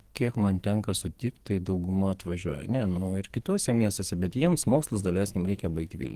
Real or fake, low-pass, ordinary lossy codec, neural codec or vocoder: fake; 14.4 kHz; Opus, 24 kbps; codec, 44.1 kHz, 2.6 kbps, SNAC